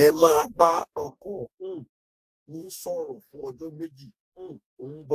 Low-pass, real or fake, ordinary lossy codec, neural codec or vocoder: 14.4 kHz; fake; AAC, 64 kbps; codec, 44.1 kHz, 2.6 kbps, DAC